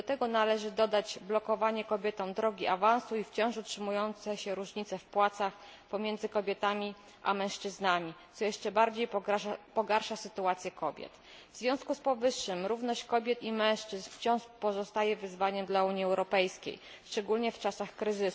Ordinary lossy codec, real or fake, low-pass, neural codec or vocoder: none; real; none; none